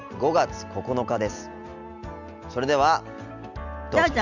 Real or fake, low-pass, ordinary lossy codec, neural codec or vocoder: real; 7.2 kHz; none; none